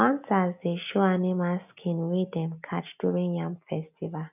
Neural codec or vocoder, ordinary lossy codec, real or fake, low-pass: none; none; real; 3.6 kHz